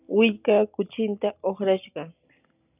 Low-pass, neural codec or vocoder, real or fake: 3.6 kHz; none; real